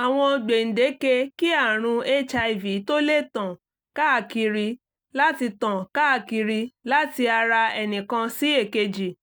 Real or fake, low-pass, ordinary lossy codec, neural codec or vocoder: real; none; none; none